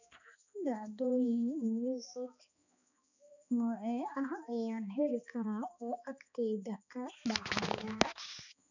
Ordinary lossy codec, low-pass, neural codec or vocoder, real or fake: none; 7.2 kHz; codec, 16 kHz, 2 kbps, X-Codec, HuBERT features, trained on balanced general audio; fake